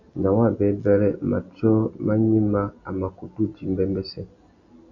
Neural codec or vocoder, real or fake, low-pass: none; real; 7.2 kHz